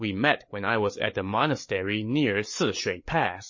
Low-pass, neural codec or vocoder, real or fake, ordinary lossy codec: 7.2 kHz; codec, 16 kHz, 8 kbps, FreqCodec, larger model; fake; MP3, 32 kbps